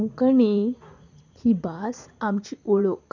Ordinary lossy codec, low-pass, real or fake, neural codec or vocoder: none; 7.2 kHz; fake; vocoder, 44.1 kHz, 80 mel bands, Vocos